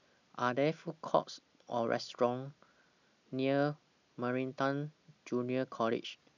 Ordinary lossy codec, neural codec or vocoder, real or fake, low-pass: none; none; real; 7.2 kHz